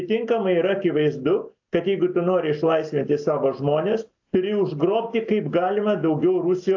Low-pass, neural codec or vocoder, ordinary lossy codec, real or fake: 7.2 kHz; none; AAC, 48 kbps; real